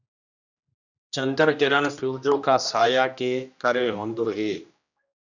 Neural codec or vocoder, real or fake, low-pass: codec, 16 kHz, 1 kbps, X-Codec, HuBERT features, trained on general audio; fake; 7.2 kHz